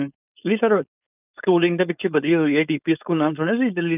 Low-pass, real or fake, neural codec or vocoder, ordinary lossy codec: 3.6 kHz; fake; codec, 16 kHz, 4.8 kbps, FACodec; none